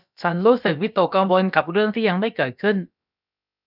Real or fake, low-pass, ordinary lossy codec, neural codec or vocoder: fake; 5.4 kHz; none; codec, 16 kHz, about 1 kbps, DyCAST, with the encoder's durations